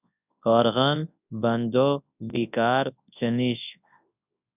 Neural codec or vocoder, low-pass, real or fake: codec, 24 kHz, 0.9 kbps, WavTokenizer, large speech release; 3.6 kHz; fake